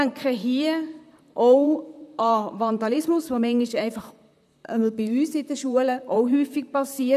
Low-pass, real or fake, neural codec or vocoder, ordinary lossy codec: 14.4 kHz; fake; vocoder, 44.1 kHz, 128 mel bands, Pupu-Vocoder; none